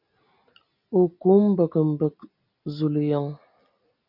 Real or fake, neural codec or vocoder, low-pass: real; none; 5.4 kHz